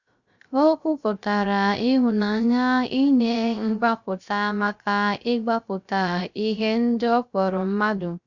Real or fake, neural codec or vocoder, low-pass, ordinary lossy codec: fake; codec, 16 kHz, 0.3 kbps, FocalCodec; 7.2 kHz; Opus, 64 kbps